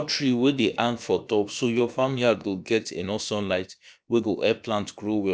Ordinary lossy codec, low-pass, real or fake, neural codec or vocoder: none; none; fake; codec, 16 kHz, about 1 kbps, DyCAST, with the encoder's durations